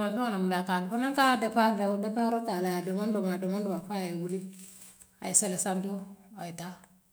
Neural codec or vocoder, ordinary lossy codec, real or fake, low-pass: autoencoder, 48 kHz, 128 numbers a frame, DAC-VAE, trained on Japanese speech; none; fake; none